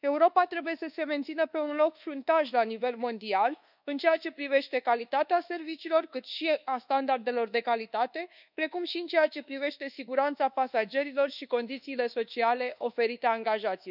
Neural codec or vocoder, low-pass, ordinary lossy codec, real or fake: codec, 24 kHz, 1.2 kbps, DualCodec; 5.4 kHz; none; fake